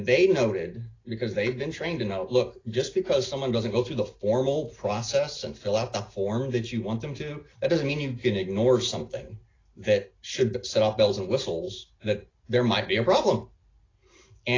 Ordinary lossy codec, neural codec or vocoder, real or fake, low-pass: AAC, 32 kbps; none; real; 7.2 kHz